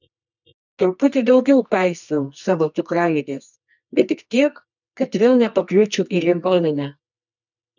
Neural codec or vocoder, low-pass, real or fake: codec, 24 kHz, 0.9 kbps, WavTokenizer, medium music audio release; 7.2 kHz; fake